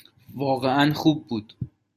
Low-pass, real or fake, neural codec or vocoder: 14.4 kHz; real; none